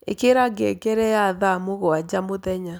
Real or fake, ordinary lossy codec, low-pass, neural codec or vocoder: real; none; none; none